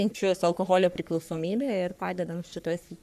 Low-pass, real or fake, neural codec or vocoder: 14.4 kHz; fake; codec, 44.1 kHz, 3.4 kbps, Pupu-Codec